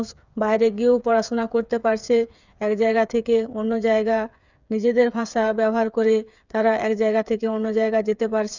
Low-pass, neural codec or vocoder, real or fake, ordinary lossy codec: 7.2 kHz; codec, 16 kHz, 8 kbps, FreqCodec, smaller model; fake; none